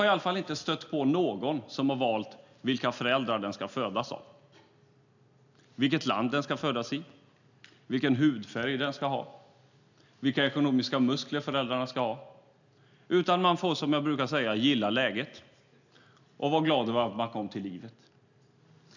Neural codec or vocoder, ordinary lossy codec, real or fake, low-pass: none; none; real; 7.2 kHz